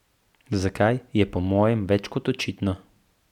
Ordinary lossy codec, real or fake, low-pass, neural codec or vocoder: none; real; 19.8 kHz; none